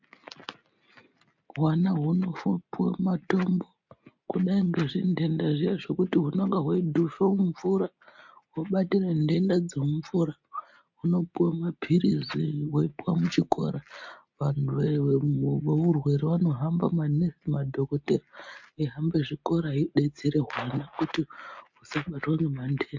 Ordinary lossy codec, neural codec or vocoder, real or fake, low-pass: MP3, 48 kbps; none; real; 7.2 kHz